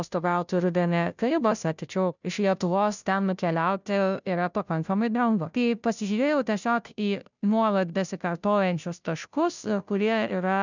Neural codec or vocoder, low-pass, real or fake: codec, 16 kHz, 0.5 kbps, FunCodec, trained on Chinese and English, 25 frames a second; 7.2 kHz; fake